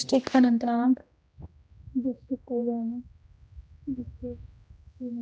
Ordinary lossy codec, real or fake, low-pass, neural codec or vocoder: none; fake; none; codec, 16 kHz, 1 kbps, X-Codec, HuBERT features, trained on general audio